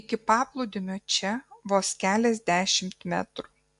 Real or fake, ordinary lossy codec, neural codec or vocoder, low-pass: real; AAC, 64 kbps; none; 10.8 kHz